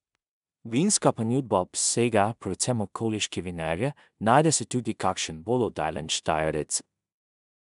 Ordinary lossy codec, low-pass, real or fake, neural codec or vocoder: none; 10.8 kHz; fake; codec, 16 kHz in and 24 kHz out, 0.4 kbps, LongCat-Audio-Codec, two codebook decoder